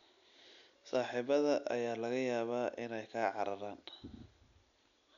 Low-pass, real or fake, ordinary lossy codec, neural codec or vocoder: 7.2 kHz; real; none; none